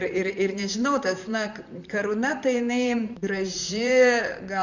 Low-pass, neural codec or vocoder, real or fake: 7.2 kHz; vocoder, 44.1 kHz, 128 mel bands, Pupu-Vocoder; fake